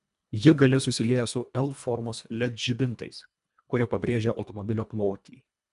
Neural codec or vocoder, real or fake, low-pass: codec, 24 kHz, 1.5 kbps, HILCodec; fake; 10.8 kHz